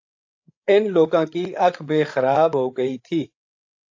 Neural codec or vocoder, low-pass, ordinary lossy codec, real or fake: codec, 16 kHz, 8 kbps, FreqCodec, larger model; 7.2 kHz; AAC, 48 kbps; fake